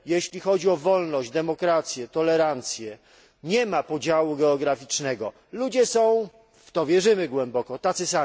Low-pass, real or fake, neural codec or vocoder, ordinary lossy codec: none; real; none; none